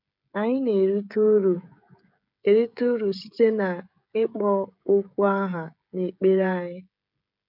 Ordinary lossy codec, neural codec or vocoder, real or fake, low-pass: none; codec, 16 kHz, 16 kbps, FreqCodec, smaller model; fake; 5.4 kHz